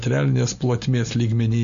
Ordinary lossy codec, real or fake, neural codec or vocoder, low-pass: Opus, 64 kbps; real; none; 7.2 kHz